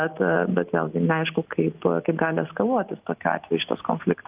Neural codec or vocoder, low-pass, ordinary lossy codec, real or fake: none; 3.6 kHz; Opus, 32 kbps; real